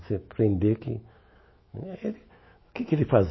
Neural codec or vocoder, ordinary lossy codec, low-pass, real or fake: autoencoder, 48 kHz, 128 numbers a frame, DAC-VAE, trained on Japanese speech; MP3, 24 kbps; 7.2 kHz; fake